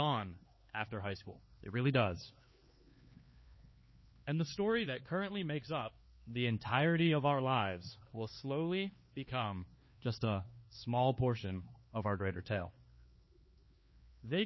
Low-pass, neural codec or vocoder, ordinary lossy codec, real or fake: 7.2 kHz; codec, 16 kHz, 4 kbps, X-Codec, HuBERT features, trained on LibriSpeech; MP3, 24 kbps; fake